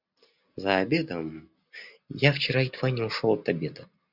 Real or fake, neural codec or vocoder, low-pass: real; none; 5.4 kHz